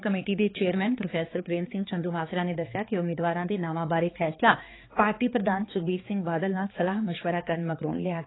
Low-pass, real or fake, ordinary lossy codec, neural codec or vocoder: 7.2 kHz; fake; AAC, 16 kbps; codec, 16 kHz, 4 kbps, X-Codec, HuBERT features, trained on balanced general audio